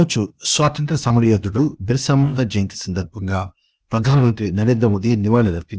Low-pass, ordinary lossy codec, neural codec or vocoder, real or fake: none; none; codec, 16 kHz, 0.8 kbps, ZipCodec; fake